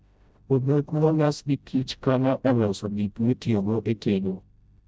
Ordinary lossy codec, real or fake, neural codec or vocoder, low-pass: none; fake; codec, 16 kHz, 0.5 kbps, FreqCodec, smaller model; none